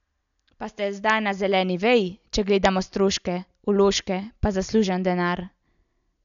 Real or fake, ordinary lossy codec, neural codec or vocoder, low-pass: real; none; none; 7.2 kHz